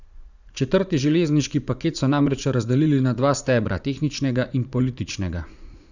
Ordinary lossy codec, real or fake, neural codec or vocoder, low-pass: none; fake; vocoder, 44.1 kHz, 80 mel bands, Vocos; 7.2 kHz